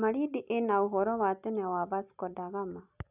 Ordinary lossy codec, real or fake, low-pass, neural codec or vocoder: none; real; 3.6 kHz; none